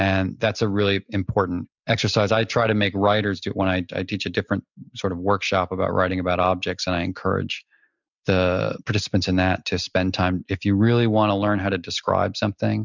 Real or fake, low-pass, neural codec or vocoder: real; 7.2 kHz; none